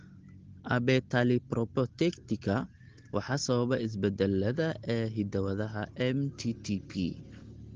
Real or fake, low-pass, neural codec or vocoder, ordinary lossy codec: real; 7.2 kHz; none; Opus, 16 kbps